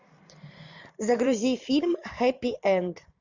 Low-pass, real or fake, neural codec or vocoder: 7.2 kHz; fake; vocoder, 44.1 kHz, 128 mel bands every 512 samples, BigVGAN v2